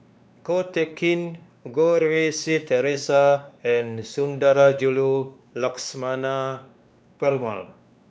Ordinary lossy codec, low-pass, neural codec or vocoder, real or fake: none; none; codec, 16 kHz, 2 kbps, X-Codec, WavLM features, trained on Multilingual LibriSpeech; fake